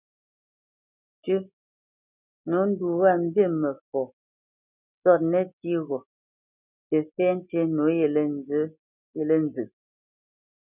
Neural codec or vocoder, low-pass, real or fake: none; 3.6 kHz; real